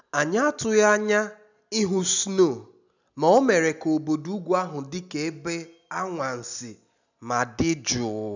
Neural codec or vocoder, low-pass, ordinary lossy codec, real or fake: none; 7.2 kHz; none; real